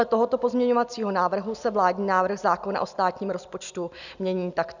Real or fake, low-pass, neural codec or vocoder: real; 7.2 kHz; none